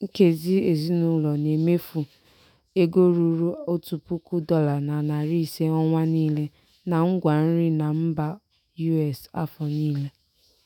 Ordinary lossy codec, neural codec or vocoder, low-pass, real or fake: none; autoencoder, 48 kHz, 128 numbers a frame, DAC-VAE, trained on Japanese speech; 19.8 kHz; fake